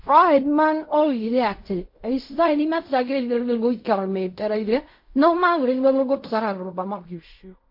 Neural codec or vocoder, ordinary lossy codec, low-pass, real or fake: codec, 16 kHz in and 24 kHz out, 0.4 kbps, LongCat-Audio-Codec, fine tuned four codebook decoder; MP3, 32 kbps; 5.4 kHz; fake